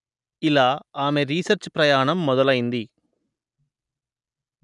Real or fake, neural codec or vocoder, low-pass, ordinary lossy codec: real; none; 10.8 kHz; none